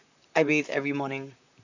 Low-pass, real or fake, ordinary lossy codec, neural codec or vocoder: 7.2 kHz; fake; none; vocoder, 44.1 kHz, 128 mel bands, Pupu-Vocoder